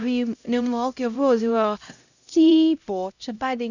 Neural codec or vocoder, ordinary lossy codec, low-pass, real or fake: codec, 16 kHz, 0.5 kbps, X-Codec, HuBERT features, trained on LibriSpeech; none; 7.2 kHz; fake